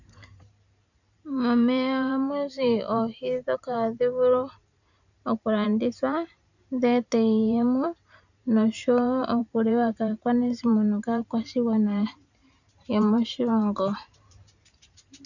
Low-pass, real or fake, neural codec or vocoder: 7.2 kHz; fake; vocoder, 44.1 kHz, 128 mel bands every 512 samples, BigVGAN v2